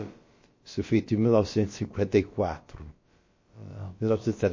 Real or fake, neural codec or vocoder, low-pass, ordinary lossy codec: fake; codec, 16 kHz, about 1 kbps, DyCAST, with the encoder's durations; 7.2 kHz; MP3, 32 kbps